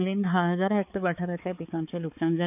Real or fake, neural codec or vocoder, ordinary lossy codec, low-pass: fake; codec, 16 kHz, 4 kbps, X-Codec, HuBERT features, trained on balanced general audio; none; 3.6 kHz